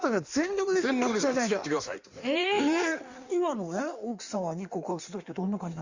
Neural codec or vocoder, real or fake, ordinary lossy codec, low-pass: codec, 16 kHz in and 24 kHz out, 1.1 kbps, FireRedTTS-2 codec; fake; Opus, 64 kbps; 7.2 kHz